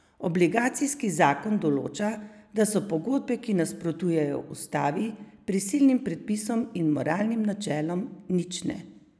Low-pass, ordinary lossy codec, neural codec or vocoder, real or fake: none; none; none; real